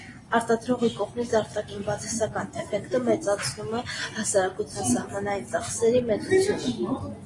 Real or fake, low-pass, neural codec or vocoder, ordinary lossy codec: real; 10.8 kHz; none; AAC, 32 kbps